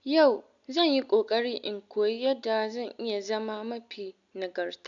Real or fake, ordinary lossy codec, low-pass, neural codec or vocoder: real; none; 7.2 kHz; none